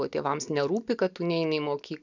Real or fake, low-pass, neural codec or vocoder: real; 7.2 kHz; none